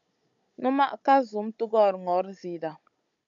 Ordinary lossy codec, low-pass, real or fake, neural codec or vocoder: AAC, 64 kbps; 7.2 kHz; fake; codec, 16 kHz, 16 kbps, FunCodec, trained on Chinese and English, 50 frames a second